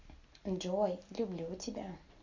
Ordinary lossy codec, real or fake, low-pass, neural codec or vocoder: Opus, 64 kbps; real; 7.2 kHz; none